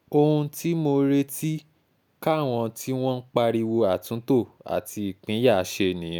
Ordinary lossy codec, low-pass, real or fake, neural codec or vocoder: none; none; real; none